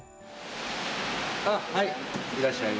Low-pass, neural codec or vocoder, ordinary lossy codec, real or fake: none; none; none; real